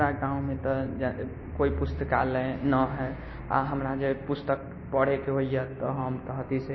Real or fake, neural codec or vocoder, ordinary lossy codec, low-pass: real; none; MP3, 24 kbps; 7.2 kHz